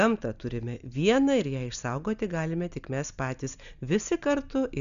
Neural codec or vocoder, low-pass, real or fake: none; 7.2 kHz; real